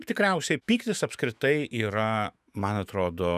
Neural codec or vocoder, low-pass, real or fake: autoencoder, 48 kHz, 128 numbers a frame, DAC-VAE, trained on Japanese speech; 14.4 kHz; fake